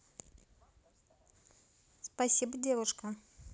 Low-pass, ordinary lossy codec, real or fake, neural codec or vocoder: none; none; real; none